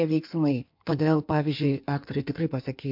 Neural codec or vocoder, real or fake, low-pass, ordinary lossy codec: codec, 16 kHz in and 24 kHz out, 1.1 kbps, FireRedTTS-2 codec; fake; 5.4 kHz; MP3, 48 kbps